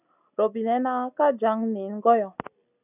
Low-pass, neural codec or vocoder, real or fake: 3.6 kHz; none; real